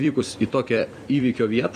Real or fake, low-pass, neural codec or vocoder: fake; 14.4 kHz; vocoder, 44.1 kHz, 128 mel bands every 256 samples, BigVGAN v2